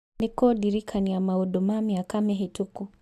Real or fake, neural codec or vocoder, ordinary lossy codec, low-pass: real; none; AAC, 96 kbps; 14.4 kHz